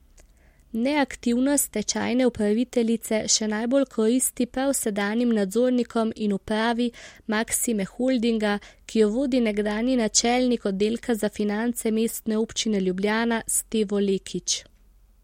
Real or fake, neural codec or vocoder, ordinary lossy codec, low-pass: real; none; MP3, 64 kbps; 19.8 kHz